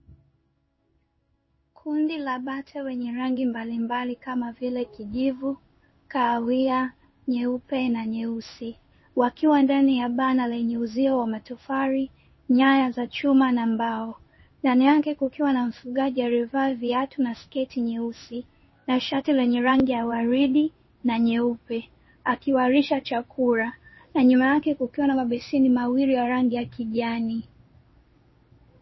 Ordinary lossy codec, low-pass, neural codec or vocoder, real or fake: MP3, 24 kbps; 7.2 kHz; codec, 16 kHz in and 24 kHz out, 1 kbps, XY-Tokenizer; fake